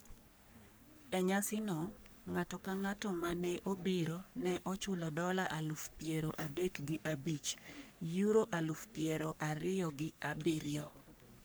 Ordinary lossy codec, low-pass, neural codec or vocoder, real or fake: none; none; codec, 44.1 kHz, 3.4 kbps, Pupu-Codec; fake